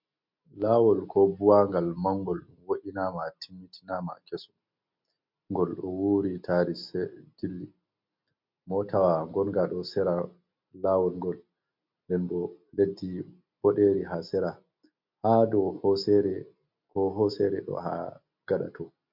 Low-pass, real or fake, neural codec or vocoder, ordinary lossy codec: 5.4 kHz; real; none; MP3, 48 kbps